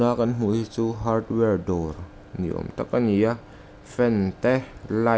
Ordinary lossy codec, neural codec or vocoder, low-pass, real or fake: none; none; none; real